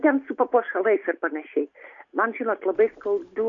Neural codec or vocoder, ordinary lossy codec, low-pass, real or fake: none; AAC, 48 kbps; 7.2 kHz; real